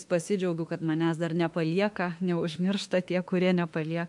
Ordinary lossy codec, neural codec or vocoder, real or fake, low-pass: MP3, 64 kbps; autoencoder, 48 kHz, 32 numbers a frame, DAC-VAE, trained on Japanese speech; fake; 10.8 kHz